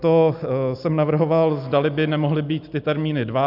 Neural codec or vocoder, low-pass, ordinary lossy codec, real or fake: none; 5.4 kHz; AAC, 48 kbps; real